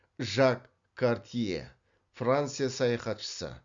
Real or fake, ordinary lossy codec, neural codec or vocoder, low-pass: real; Opus, 64 kbps; none; 7.2 kHz